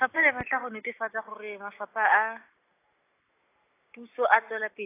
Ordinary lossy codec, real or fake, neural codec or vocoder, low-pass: AAC, 24 kbps; real; none; 3.6 kHz